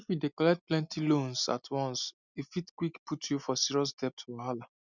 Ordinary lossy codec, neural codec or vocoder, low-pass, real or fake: none; none; 7.2 kHz; real